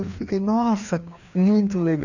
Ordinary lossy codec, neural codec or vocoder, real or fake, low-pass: none; codec, 16 kHz, 2 kbps, FreqCodec, larger model; fake; 7.2 kHz